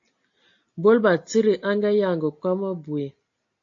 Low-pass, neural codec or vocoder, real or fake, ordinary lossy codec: 7.2 kHz; none; real; AAC, 48 kbps